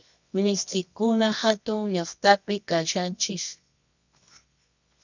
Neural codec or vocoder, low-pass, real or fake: codec, 24 kHz, 0.9 kbps, WavTokenizer, medium music audio release; 7.2 kHz; fake